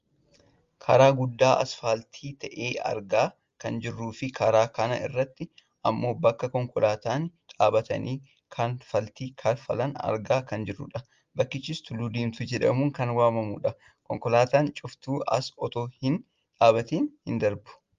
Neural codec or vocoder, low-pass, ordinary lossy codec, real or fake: none; 7.2 kHz; Opus, 32 kbps; real